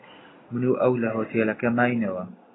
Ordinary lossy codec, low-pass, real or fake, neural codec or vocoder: AAC, 16 kbps; 7.2 kHz; real; none